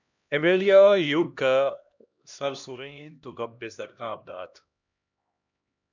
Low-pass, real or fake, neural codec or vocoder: 7.2 kHz; fake; codec, 16 kHz, 1 kbps, X-Codec, HuBERT features, trained on LibriSpeech